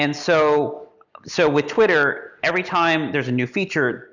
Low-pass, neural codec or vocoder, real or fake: 7.2 kHz; none; real